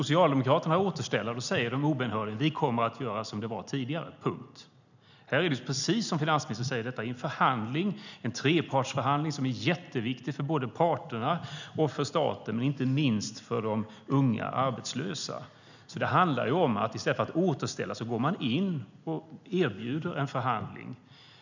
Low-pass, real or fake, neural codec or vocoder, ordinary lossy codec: 7.2 kHz; real; none; none